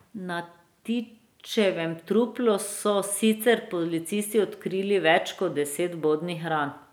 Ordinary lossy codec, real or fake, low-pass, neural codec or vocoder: none; real; none; none